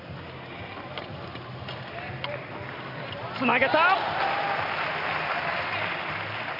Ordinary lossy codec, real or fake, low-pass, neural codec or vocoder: none; real; 5.4 kHz; none